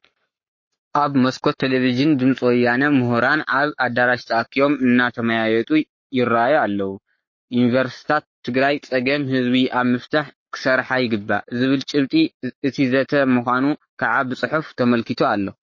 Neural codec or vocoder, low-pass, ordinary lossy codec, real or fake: codec, 44.1 kHz, 7.8 kbps, Pupu-Codec; 7.2 kHz; MP3, 32 kbps; fake